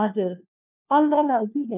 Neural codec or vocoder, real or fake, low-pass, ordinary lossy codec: codec, 16 kHz, 1 kbps, FunCodec, trained on LibriTTS, 50 frames a second; fake; 3.6 kHz; none